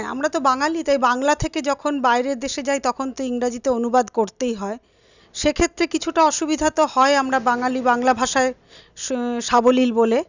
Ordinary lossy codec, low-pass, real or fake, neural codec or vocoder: none; 7.2 kHz; real; none